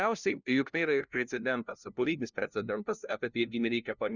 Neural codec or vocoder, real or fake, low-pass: codec, 16 kHz, 0.5 kbps, FunCodec, trained on LibriTTS, 25 frames a second; fake; 7.2 kHz